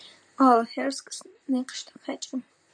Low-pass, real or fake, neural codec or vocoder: 9.9 kHz; fake; vocoder, 44.1 kHz, 128 mel bands, Pupu-Vocoder